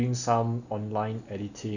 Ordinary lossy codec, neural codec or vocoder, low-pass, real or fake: none; none; 7.2 kHz; real